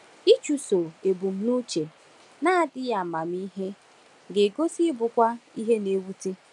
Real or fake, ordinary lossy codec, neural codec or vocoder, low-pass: real; none; none; 10.8 kHz